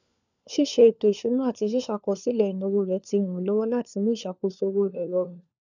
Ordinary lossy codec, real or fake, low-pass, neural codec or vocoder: none; fake; 7.2 kHz; codec, 16 kHz, 4 kbps, FunCodec, trained on LibriTTS, 50 frames a second